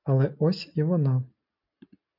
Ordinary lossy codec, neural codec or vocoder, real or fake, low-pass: MP3, 48 kbps; none; real; 5.4 kHz